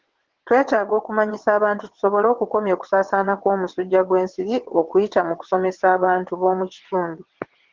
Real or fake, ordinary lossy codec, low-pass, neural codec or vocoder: fake; Opus, 16 kbps; 7.2 kHz; vocoder, 22.05 kHz, 80 mel bands, WaveNeXt